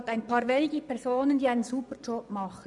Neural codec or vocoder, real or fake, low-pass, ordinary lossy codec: vocoder, 24 kHz, 100 mel bands, Vocos; fake; 10.8 kHz; none